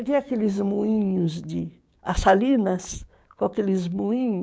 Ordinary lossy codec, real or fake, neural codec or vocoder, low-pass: none; fake; codec, 16 kHz, 6 kbps, DAC; none